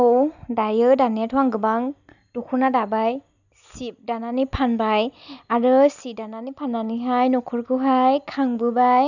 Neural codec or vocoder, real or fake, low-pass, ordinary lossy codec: none; real; 7.2 kHz; none